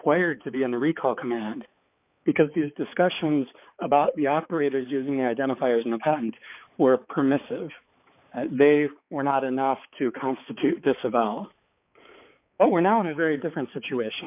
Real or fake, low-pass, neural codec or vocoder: fake; 3.6 kHz; codec, 16 kHz, 4 kbps, X-Codec, HuBERT features, trained on balanced general audio